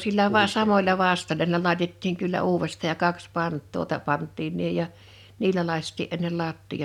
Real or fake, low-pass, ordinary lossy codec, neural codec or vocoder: real; 19.8 kHz; none; none